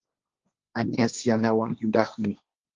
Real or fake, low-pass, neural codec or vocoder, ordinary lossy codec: fake; 7.2 kHz; codec, 16 kHz, 1.1 kbps, Voila-Tokenizer; Opus, 24 kbps